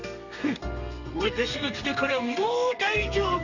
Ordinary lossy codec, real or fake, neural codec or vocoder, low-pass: none; fake; codec, 32 kHz, 1.9 kbps, SNAC; 7.2 kHz